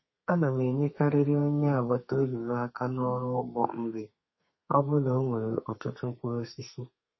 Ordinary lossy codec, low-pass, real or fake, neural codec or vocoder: MP3, 24 kbps; 7.2 kHz; fake; codec, 32 kHz, 1.9 kbps, SNAC